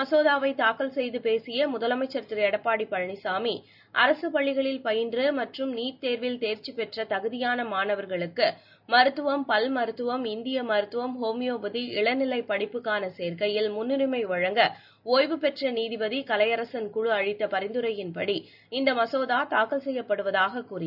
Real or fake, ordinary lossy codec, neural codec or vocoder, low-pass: real; none; none; 5.4 kHz